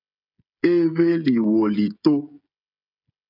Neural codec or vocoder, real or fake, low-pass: codec, 16 kHz, 16 kbps, FreqCodec, smaller model; fake; 5.4 kHz